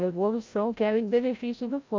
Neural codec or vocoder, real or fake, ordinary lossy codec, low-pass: codec, 16 kHz, 0.5 kbps, FreqCodec, larger model; fake; MP3, 48 kbps; 7.2 kHz